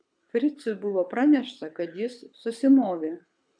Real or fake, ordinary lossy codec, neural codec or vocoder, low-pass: fake; MP3, 96 kbps; codec, 24 kHz, 6 kbps, HILCodec; 9.9 kHz